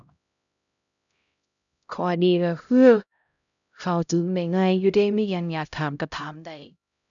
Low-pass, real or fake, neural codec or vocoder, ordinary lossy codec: 7.2 kHz; fake; codec, 16 kHz, 0.5 kbps, X-Codec, HuBERT features, trained on LibriSpeech; none